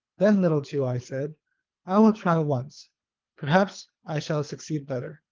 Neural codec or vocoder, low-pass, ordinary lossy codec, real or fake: codec, 24 kHz, 3 kbps, HILCodec; 7.2 kHz; Opus, 32 kbps; fake